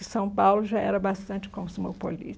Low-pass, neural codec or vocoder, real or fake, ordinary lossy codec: none; none; real; none